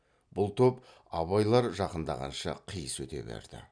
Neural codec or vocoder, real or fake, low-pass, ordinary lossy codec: none; real; 9.9 kHz; none